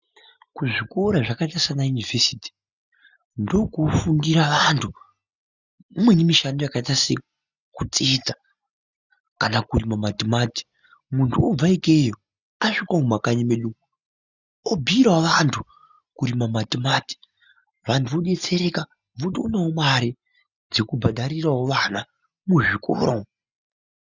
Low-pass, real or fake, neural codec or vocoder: 7.2 kHz; real; none